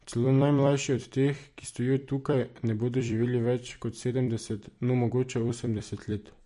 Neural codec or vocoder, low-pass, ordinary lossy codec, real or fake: vocoder, 44.1 kHz, 128 mel bands every 256 samples, BigVGAN v2; 14.4 kHz; MP3, 48 kbps; fake